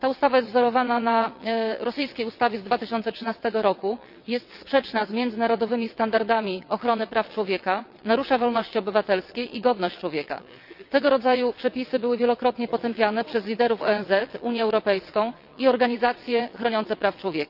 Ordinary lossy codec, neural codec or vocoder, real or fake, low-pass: none; vocoder, 22.05 kHz, 80 mel bands, WaveNeXt; fake; 5.4 kHz